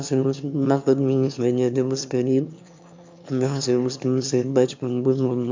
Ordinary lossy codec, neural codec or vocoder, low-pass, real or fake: MP3, 64 kbps; autoencoder, 22.05 kHz, a latent of 192 numbers a frame, VITS, trained on one speaker; 7.2 kHz; fake